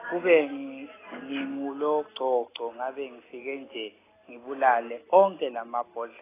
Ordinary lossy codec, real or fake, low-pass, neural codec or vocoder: AAC, 16 kbps; real; 3.6 kHz; none